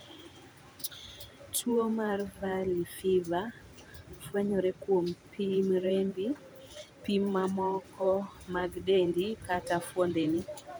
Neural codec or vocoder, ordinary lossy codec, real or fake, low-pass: vocoder, 44.1 kHz, 128 mel bands every 512 samples, BigVGAN v2; none; fake; none